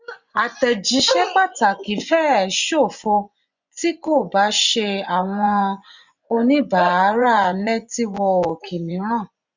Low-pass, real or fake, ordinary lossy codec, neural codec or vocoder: 7.2 kHz; fake; none; vocoder, 24 kHz, 100 mel bands, Vocos